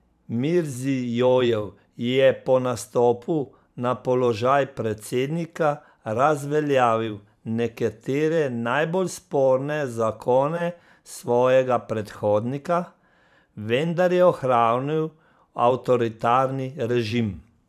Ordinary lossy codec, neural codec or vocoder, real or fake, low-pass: none; vocoder, 44.1 kHz, 128 mel bands every 512 samples, BigVGAN v2; fake; 14.4 kHz